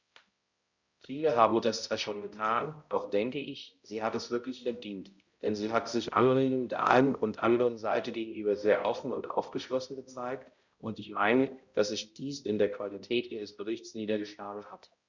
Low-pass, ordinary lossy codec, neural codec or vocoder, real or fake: 7.2 kHz; Opus, 64 kbps; codec, 16 kHz, 0.5 kbps, X-Codec, HuBERT features, trained on balanced general audio; fake